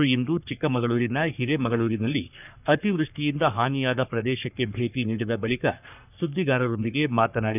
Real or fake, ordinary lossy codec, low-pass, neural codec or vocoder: fake; none; 3.6 kHz; codec, 44.1 kHz, 3.4 kbps, Pupu-Codec